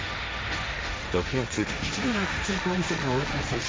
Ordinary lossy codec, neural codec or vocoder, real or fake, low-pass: none; codec, 16 kHz, 1.1 kbps, Voila-Tokenizer; fake; none